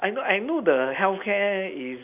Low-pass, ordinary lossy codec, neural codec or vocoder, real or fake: 3.6 kHz; none; none; real